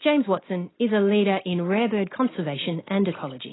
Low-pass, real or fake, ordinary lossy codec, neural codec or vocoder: 7.2 kHz; real; AAC, 16 kbps; none